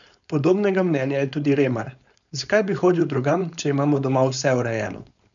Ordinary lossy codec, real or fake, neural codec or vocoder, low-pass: none; fake; codec, 16 kHz, 4.8 kbps, FACodec; 7.2 kHz